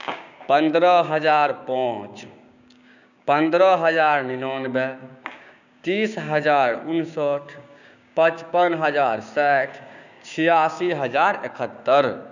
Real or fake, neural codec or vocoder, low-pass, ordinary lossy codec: fake; codec, 16 kHz, 6 kbps, DAC; 7.2 kHz; none